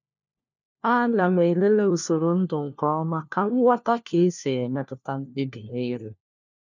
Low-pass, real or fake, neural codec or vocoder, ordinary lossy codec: 7.2 kHz; fake; codec, 16 kHz, 1 kbps, FunCodec, trained on LibriTTS, 50 frames a second; none